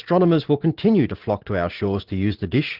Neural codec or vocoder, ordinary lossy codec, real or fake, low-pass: vocoder, 44.1 kHz, 80 mel bands, Vocos; Opus, 16 kbps; fake; 5.4 kHz